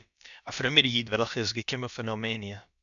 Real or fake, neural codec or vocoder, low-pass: fake; codec, 16 kHz, about 1 kbps, DyCAST, with the encoder's durations; 7.2 kHz